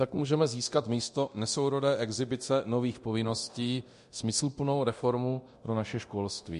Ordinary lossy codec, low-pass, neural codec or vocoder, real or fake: MP3, 48 kbps; 10.8 kHz; codec, 24 kHz, 0.9 kbps, DualCodec; fake